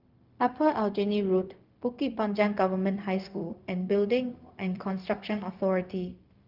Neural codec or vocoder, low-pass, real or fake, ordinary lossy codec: codec, 16 kHz, 0.4 kbps, LongCat-Audio-Codec; 5.4 kHz; fake; Opus, 32 kbps